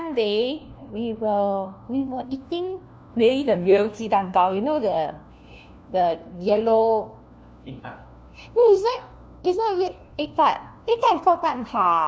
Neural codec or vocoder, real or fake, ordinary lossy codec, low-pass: codec, 16 kHz, 1 kbps, FunCodec, trained on LibriTTS, 50 frames a second; fake; none; none